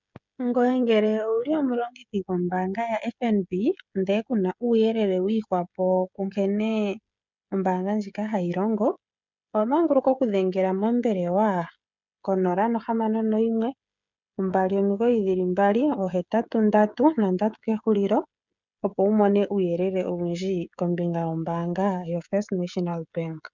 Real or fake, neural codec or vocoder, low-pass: fake; codec, 16 kHz, 16 kbps, FreqCodec, smaller model; 7.2 kHz